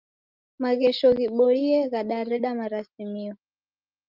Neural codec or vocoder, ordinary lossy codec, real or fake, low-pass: none; Opus, 32 kbps; real; 5.4 kHz